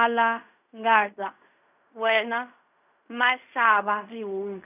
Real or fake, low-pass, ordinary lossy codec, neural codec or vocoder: fake; 3.6 kHz; none; codec, 16 kHz in and 24 kHz out, 0.4 kbps, LongCat-Audio-Codec, fine tuned four codebook decoder